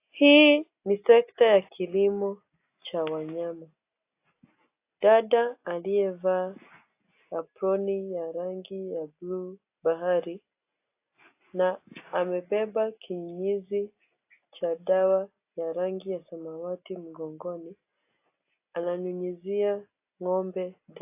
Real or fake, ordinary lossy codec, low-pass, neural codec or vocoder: real; AAC, 24 kbps; 3.6 kHz; none